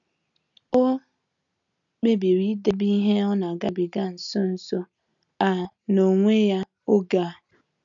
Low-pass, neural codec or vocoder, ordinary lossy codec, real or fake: 7.2 kHz; none; none; real